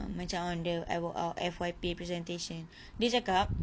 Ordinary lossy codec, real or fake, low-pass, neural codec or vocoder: none; real; none; none